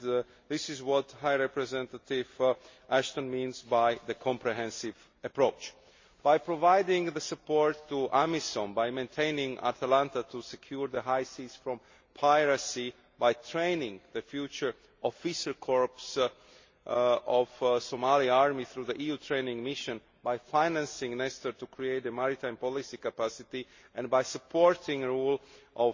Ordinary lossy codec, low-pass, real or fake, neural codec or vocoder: MP3, 32 kbps; 7.2 kHz; real; none